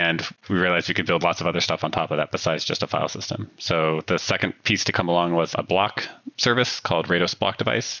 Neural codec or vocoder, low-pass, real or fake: none; 7.2 kHz; real